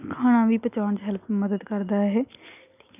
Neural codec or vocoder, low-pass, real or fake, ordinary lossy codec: none; 3.6 kHz; real; none